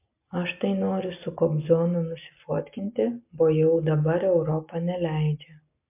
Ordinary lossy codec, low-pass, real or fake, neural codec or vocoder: MP3, 32 kbps; 3.6 kHz; real; none